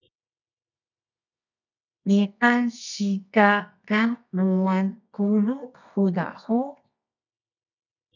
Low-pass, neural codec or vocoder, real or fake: 7.2 kHz; codec, 24 kHz, 0.9 kbps, WavTokenizer, medium music audio release; fake